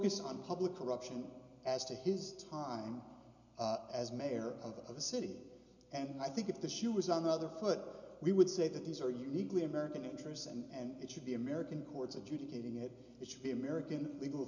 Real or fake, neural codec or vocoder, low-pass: real; none; 7.2 kHz